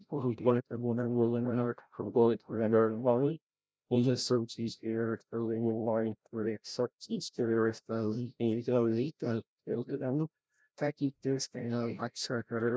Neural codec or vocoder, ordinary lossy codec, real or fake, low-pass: codec, 16 kHz, 0.5 kbps, FreqCodec, larger model; none; fake; none